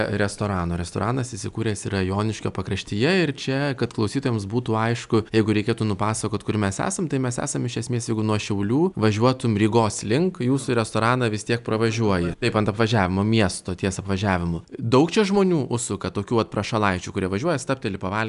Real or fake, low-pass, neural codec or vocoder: real; 10.8 kHz; none